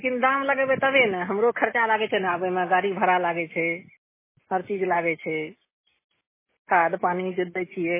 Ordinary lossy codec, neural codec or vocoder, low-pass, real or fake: MP3, 16 kbps; none; 3.6 kHz; real